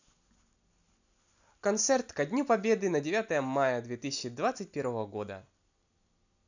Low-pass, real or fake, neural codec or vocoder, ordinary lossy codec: 7.2 kHz; real; none; none